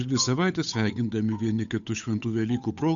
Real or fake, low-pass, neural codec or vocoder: fake; 7.2 kHz; codec, 16 kHz, 8 kbps, FunCodec, trained on Chinese and English, 25 frames a second